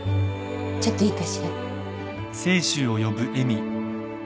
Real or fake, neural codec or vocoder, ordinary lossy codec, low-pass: real; none; none; none